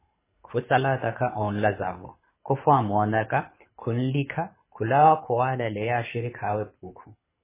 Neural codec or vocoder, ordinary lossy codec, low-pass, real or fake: codec, 24 kHz, 6 kbps, HILCodec; MP3, 16 kbps; 3.6 kHz; fake